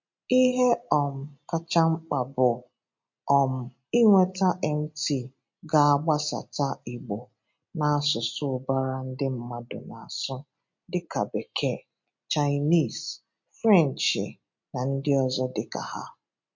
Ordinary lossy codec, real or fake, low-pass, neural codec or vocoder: MP3, 48 kbps; real; 7.2 kHz; none